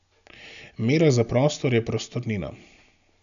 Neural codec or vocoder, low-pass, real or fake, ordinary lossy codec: none; 7.2 kHz; real; none